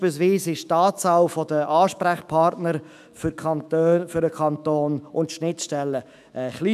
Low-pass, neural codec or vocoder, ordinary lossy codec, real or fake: 14.4 kHz; autoencoder, 48 kHz, 128 numbers a frame, DAC-VAE, trained on Japanese speech; none; fake